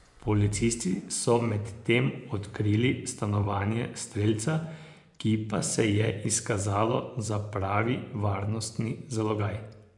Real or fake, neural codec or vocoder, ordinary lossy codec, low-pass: fake; vocoder, 24 kHz, 100 mel bands, Vocos; MP3, 96 kbps; 10.8 kHz